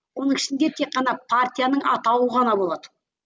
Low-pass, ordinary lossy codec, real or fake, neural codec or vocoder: none; none; real; none